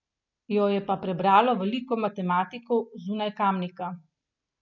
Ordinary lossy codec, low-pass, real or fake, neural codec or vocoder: none; 7.2 kHz; real; none